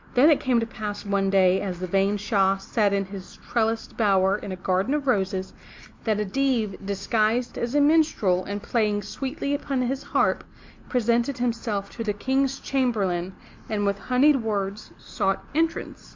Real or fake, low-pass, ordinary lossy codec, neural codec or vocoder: real; 7.2 kHz; MP3, 64 kbps; none